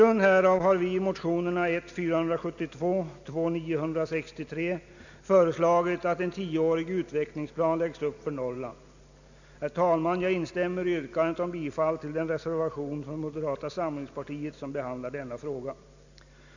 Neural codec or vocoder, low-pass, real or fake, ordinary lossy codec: none; 7.2 kHz; real; none